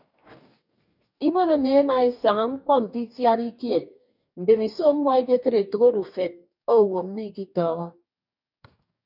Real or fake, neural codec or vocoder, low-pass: fake; codec, 44.1 kHz, 2.6 kbps, DAC; 5.4 kHz